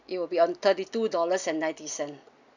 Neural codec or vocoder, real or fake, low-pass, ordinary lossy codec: none; real; 7.2 kHz; none